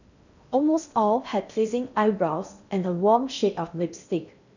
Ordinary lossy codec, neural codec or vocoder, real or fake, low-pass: none; codec, 16 kHz in and 24 kHz out, 0.6 kbps, FocalCodec, streaming, 2048 codes; fake; 7.2 kHz